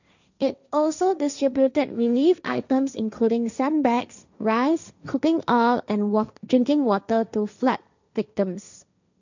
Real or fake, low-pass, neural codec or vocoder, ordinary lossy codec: fake; 7.2 kHz; codec, 16 kHz, 1.1 kbps, Voila-Tokenizer; none